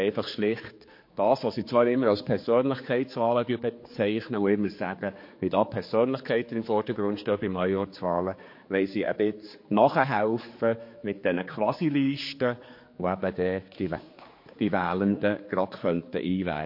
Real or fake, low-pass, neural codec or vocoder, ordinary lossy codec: fake; 5.4 kHz; codec, 16 kHz, 4 kbps, X-Codec, HuBERT features, trained on general audio; MP3, 32 kbps